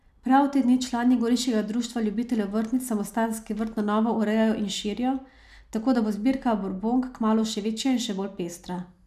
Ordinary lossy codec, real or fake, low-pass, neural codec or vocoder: none; real; 14.4 kHz; none